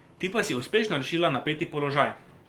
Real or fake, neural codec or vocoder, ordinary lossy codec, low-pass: fake; codec, 44.1 kHz, 7.8 kbps, DAC; Opus, 24 kbps; 19.8 kHz